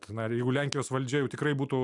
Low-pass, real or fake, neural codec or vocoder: 10.8 kHz; real; none